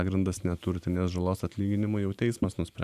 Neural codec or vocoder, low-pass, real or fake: vocoder, 44.1 kHz, 128 mel bands every 512 samples, BigVGAN v2; 14.4 kHz; fake